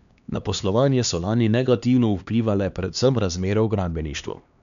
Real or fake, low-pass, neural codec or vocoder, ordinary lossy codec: fake; 7.2 kHz; codec, 16 kHz, 2 kbps, X-Codec, HuBERT features, trained on LibriSpeech; none